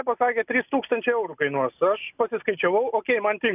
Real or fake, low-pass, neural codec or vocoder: real; 3.6 kHz; none